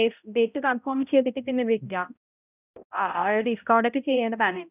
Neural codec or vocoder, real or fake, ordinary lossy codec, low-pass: codec, 16 kHz, 0.5 kbps, X-Codec, HuBERT features, trained on balanced general audio; fake; none; 3.6 kHz